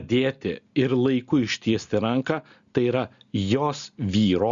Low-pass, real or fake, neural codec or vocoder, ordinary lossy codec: 7.2 kHz; real; none; Opus, 64 kbps